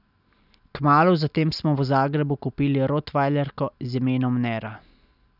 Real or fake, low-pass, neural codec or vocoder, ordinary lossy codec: real; 5.4 kHz; none; none